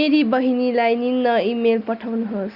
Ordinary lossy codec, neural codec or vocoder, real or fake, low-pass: Opus, 64 kbps; none; real; 5.4 kHz